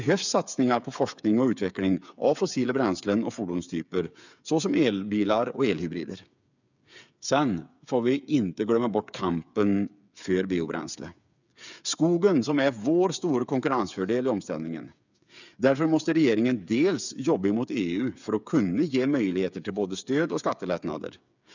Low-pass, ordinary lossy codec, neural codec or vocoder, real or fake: 7.2 kHz; none; codec, 16 kHz, 8 kbps, FreqCodec, smaller model; fake